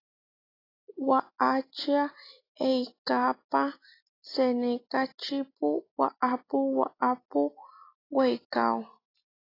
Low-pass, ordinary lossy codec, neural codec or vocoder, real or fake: 5.4 kHz; AAC, 24 kbps; none; real